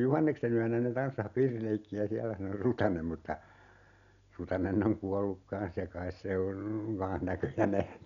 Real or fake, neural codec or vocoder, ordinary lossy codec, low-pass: real; none; none; 7.2 kHz